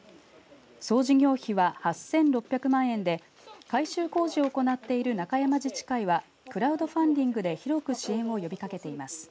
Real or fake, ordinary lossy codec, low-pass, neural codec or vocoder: real; none; none; none